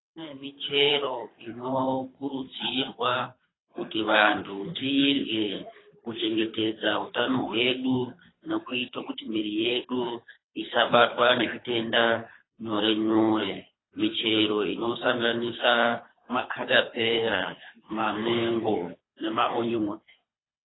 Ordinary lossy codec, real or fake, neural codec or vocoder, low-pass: AAC, 16 kbps; fake; codec, 24 kHz, 3 kbps, HILCodec; 7.2 kHz